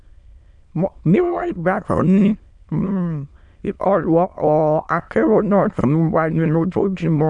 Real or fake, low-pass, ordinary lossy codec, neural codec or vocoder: fake; 9.9 kHz; none; autoencoder, 22.05 kHz, a latent of 192 numbers a frame, VITS, trained on many speakers